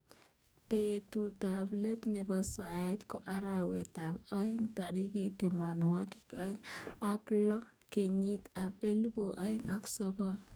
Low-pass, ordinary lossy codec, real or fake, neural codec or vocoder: none; none; fake; codec, 44.1 kHz, 2.6 kbps, DAC